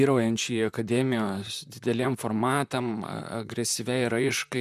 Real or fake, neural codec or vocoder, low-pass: fake; vocoder, 44.1 kHz, 128 mel bands, Pupu-Vocoder; 14.4 kHz